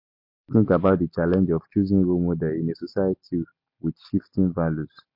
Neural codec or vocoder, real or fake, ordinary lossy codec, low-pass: none; real; MP3, 32 kbps; 5.4 kHz